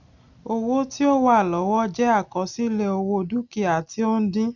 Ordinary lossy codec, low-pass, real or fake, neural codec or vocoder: none; 7.2 kHz; real; none